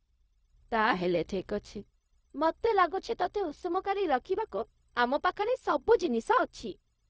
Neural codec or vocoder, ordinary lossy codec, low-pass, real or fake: codec, 16 kHz, 0.4 kbps, LongCat-Audio-Codec; none; none; fake